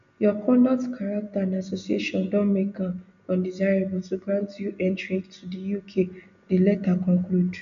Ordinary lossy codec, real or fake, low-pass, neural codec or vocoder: MP3, 96 kbps; real; 7.2 kHz; none